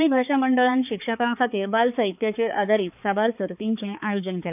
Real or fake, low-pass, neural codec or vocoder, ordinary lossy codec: fake; 3.6 kHz; codec, 16 kHz, 4 kbps, X-Codec, HuBERT features, trained on balanced general audio; none